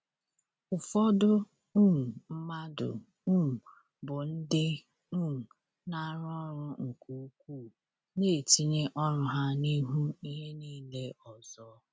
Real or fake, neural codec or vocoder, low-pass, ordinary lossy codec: real; none; none; none